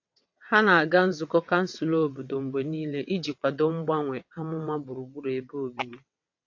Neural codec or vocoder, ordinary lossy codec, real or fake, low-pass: vocoder, 22.05 kHz, 80 mel bands, WaveNeXt; AAC, 48 kbps; fake; 7.2 kHz